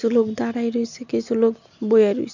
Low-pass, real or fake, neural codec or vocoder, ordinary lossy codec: 7.2 kHz; real; none; none